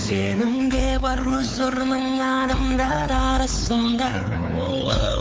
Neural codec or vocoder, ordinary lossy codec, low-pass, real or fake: codec, 16 kHz, 4 kbps, X-Codec, WavLM features, trained on Multilingual LibriSpeech; none; none; fake